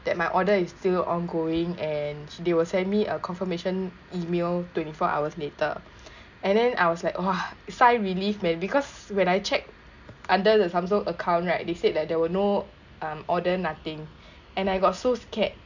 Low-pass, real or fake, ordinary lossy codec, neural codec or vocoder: 7.2 kHz; real; none; none